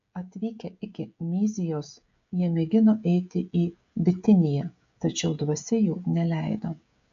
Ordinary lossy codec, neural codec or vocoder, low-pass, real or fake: AAC, 64 kbps; codec, 16 kHz, 16 kbps, FreqCodec, smaller model; 7.2 kHz; fake